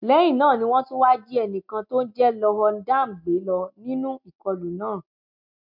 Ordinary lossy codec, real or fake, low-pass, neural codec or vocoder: MP3, 48 kbps; real; 5.4 kHz; none